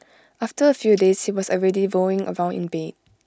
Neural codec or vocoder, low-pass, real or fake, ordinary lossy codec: none; none; real; none